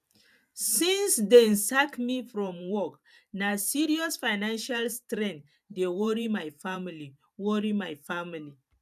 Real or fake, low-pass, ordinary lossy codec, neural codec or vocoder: fake; 14.4 kHz; none; vocoder, 44.1 kHz, 128 mel bands every 512 samples, BigVGAN v2